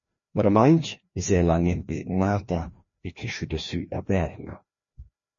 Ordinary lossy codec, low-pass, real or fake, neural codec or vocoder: MP3, 32 kbps; 7.2 kHz; fake; codec, 16 kHz, 1 kbps, FreqCodec, larger model